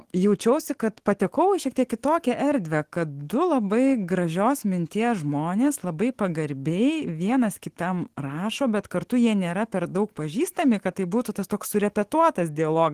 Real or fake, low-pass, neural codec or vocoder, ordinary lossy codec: fake; 14.4 kHz; autoencoder, 48 kHz, 128 numbers a frame, DAC-VAE, trained on Japanese speech; Opus, 16 kbps